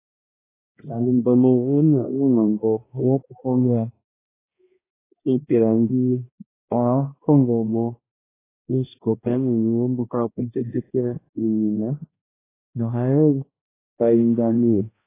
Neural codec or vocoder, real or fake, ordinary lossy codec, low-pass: codec, 16 kHz, 1 kbps, X-Codec, HuBERT features, trained on balanced general audio; fake; AAC, 16 kbps; 3.6 kHz